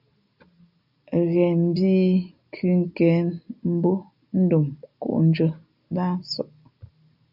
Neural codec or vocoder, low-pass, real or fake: none; 5.4 kHz; real